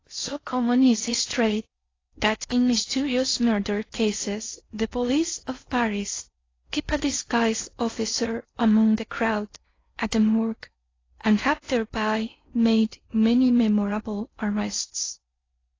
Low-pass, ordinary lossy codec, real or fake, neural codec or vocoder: 7.2 kHz; AAC, 32 kbps; fake; codec, 16 kHz in and 24 kHz out, 0.6 kbps, FocalCodec, streaming, 4096 codes